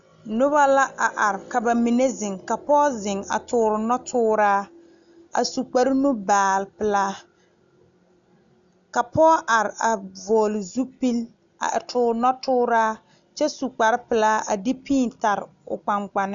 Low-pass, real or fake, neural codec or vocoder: 7.2 kHz; real; none